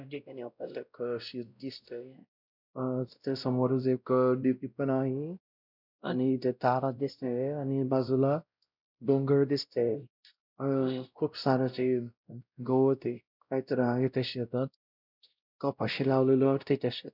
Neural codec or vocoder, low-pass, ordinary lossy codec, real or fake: codec, 16 kHz, 0.5 kbps, X-Codec, WavLM features, trained on Multilingual LibriSpeech; 5.4 kHz; none; fake